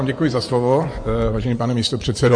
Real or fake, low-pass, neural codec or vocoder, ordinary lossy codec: fake; 9.9 kHz; vocoder, 24 kHz, 100 mel bands, Vocos; MP3, 48 kbps